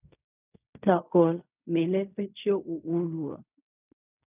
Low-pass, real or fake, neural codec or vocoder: 3.6 kHz; fake; codec, 16 kHz in and 24 kHz out, 0.4 kbps, LongCat-Audio-Codec, fine tuned four codebook decoder